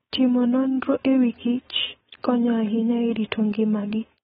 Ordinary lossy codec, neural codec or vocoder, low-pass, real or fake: AAC, 16 kbps; codec, 16 kHz, 4.8 kbps, FACodec; 7.2 kHz; fake